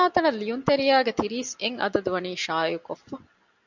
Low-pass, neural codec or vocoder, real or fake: 7.2 kHz; none; real